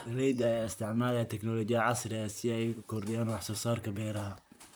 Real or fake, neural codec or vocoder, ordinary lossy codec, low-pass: fake; vocoder, 44.1 kHz, 128 mel bands, Pupu-Vocoder; none; none